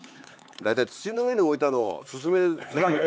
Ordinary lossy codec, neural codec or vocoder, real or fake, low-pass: none; codec, 16 kHz, 4 kbps, X-Codec, HuBERT features, trained on LibriSpeech; fake; none